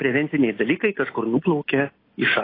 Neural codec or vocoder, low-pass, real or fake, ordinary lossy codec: none; 5.4 kHz; real; AAC, 24 kbps